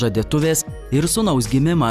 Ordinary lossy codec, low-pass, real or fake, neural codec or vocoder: Opus, 64 kbps; 14.4 kHz; real; none